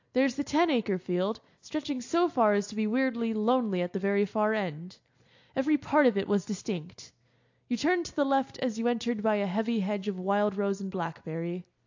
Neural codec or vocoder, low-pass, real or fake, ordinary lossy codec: none; 7.2 kHz; real; AAC, 48 kbps